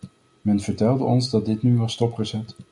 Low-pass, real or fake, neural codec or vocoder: 10.8 kHz; real; none